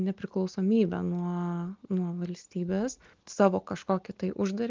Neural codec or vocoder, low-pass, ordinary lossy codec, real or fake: none; 7.2 kHz; Opus, 32 kbps; real